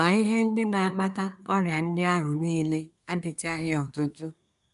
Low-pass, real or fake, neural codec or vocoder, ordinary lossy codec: 10.8 kHz; fake; codec, 24 kHz, 1 kbps, SNAC; none